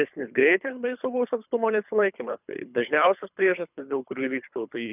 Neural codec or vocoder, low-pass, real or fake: codec, 24 kHz, 3 kbps, HILCodec; 3.6 kHz; fake